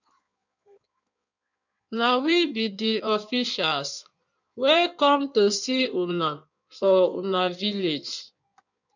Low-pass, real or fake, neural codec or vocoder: 7.2 kHz; fake; codec, 16 kHz in and 24 kHz out, 1.1 kbps, FireRedTTS-2 codec